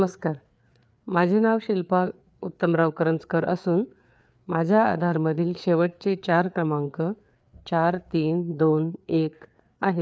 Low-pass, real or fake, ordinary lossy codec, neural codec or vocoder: none; fake; none; codec, 16 kHz, 4 kbps, FreqCodec, larger model